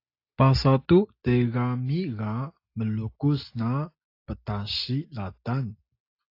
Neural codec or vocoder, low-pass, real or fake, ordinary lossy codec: none; 5.4 kHz; real; AAC, 32 kbps